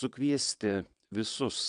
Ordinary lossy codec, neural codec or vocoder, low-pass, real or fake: MP3, 96 kbps; vocoder, 22.05 kHz, 80 mel bands, WaveNeXt; 9.9 kHz; fake